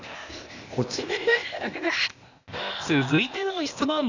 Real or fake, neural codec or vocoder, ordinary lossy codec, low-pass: fake; codec, 16 kHz, 0.8 kbps, ZipCodec; none; 7.2 kHz